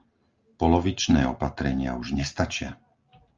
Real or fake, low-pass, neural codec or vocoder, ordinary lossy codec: real; 7.2 kHz; none; Opus, 32 kbps